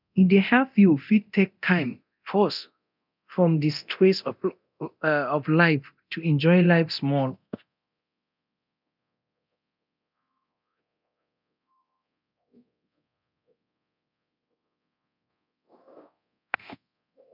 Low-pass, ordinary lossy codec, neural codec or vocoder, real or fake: 5.4 kHz; none; codec, 24 kHz, 0.9 kbps, DualCodec; fake